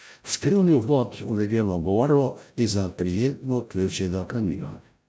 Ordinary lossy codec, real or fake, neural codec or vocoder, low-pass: none; fake; codec, 16 kHz, 0.5 kbps, FreqCodec, larger model; none